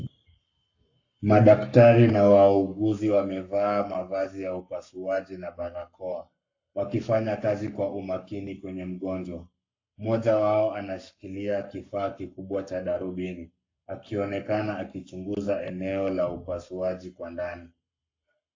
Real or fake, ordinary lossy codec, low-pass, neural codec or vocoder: fake; AAC, 48 kbps; 7.2 kHz; codec, 44.1 kHz, 7.8 kbps, Pupu-Codec